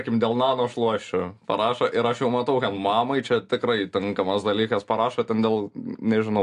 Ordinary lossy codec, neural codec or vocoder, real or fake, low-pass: AAC, 64 kbps; none; real; 10.8 kHz